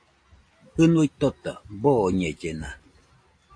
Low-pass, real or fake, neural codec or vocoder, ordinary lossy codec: 9.9 kHz; real; none; AAC, 48 kbps